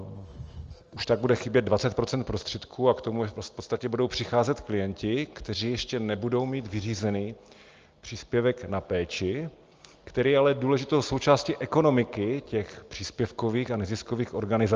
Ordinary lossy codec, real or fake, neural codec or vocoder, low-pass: Opus, 24 kbps; real; none; 7.2 kHz